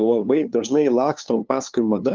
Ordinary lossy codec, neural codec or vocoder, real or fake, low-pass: Opus, 32 kbps; codec, 16 kHz, 2 kbps, FunCodec, trained on LibriTTS, 25 frames a second; fake; 7.2 kHz